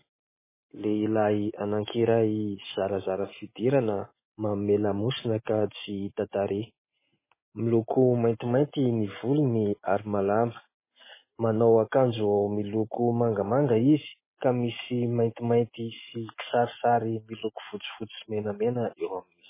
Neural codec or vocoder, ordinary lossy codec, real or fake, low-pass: none; MP3, 16 kbps; real; 3.6 kHz